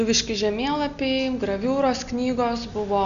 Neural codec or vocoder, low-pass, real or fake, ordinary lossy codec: none; 7.2 kHz; real; Opus, 64 kbps